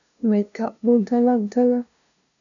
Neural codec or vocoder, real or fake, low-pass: codec, 16 kHz, 0.5 kbps, FunCodec, trained on LibriTTS, 25 frames a second; fake; 7.2 kHz